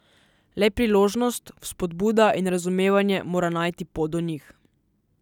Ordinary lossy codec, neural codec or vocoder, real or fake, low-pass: none; none; real; 19.8 kHz